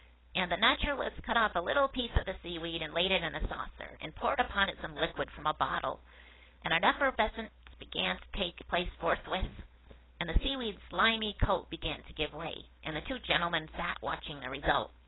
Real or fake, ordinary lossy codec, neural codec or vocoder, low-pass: fake; AAC, 16 kbps; codec, 16 kHz, 4.8 kbps, FACodec; 7.2 kHz